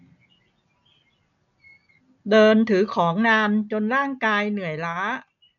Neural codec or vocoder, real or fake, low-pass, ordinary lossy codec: none; real; 7.2 kHz; none